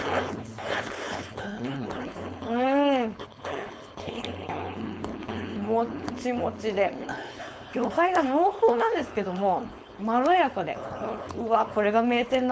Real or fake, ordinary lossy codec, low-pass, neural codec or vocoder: fake; none; none; codec, 16 kHz, 4.8 kbps, FACodec